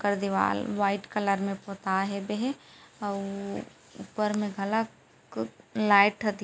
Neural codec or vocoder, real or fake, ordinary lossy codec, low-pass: none; real; none; none